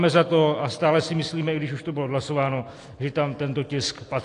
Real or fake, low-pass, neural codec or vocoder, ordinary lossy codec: real; 10.8 kHz; none; AAC, 48 kbps